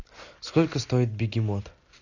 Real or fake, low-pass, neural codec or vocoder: real; 7.2 kHz; none